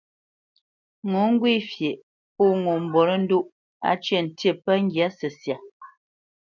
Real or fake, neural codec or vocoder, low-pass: real; none; 7.2 kHz